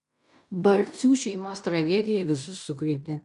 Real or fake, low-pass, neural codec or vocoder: fake; 10.8 kHz; codec, 16 kHz in and 24 kHz out, 0.9 kbps, LongCat-Audio-Codec, fine tuned four codebook decoder